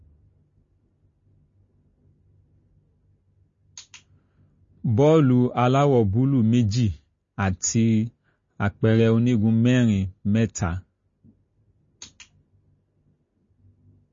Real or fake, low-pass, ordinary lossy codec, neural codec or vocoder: real; 7.2 kHz; MP3, 32 kbps; none